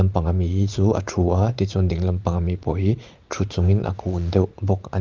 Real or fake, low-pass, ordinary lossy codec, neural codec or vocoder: fake; 7.2 kHz; Opus, 24 kbps; vocoder, 44.1 kHz, 80 mel bands, Vocos